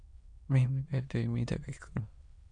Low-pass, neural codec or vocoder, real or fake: 9.9 kHz; autoencoder, 22.05 kHz, a latent of 192 numbers a frame, VITS, trained on many speakers; fake